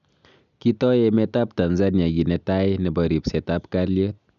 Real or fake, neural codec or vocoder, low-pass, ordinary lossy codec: real; none; 7.2 kHz; none